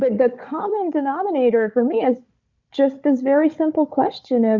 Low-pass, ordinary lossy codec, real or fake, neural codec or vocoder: 7.2 kHz; Opus, 64 kbps; fake; codec, 16 kHz, 4 kbps, FunCodec, trained on Chinese and English, 50 frames a second